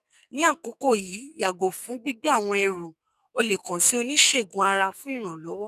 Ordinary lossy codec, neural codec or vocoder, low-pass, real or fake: none; codec, 44.1 kHz, 2.6 kbps, SNAC; 14.4 kHz; fake